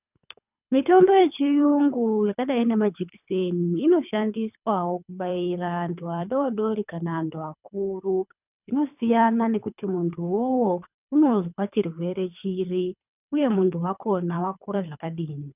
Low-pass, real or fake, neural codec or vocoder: 3.6 kHz; fake; codec, 24 kHz, 3 kbps, HILCodec